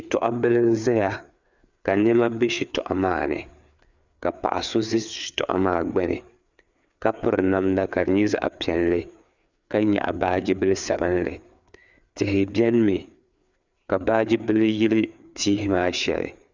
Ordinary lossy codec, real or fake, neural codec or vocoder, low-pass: Opus, 64 kbps; fake; codec, 16 kHz, 4 kbps, FreqCodec, larger model; 7.2 kHz